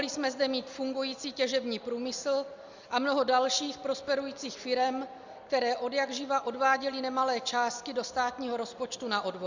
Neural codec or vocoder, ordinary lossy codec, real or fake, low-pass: none; Opus, 64 kbps; real; 7.2 kHz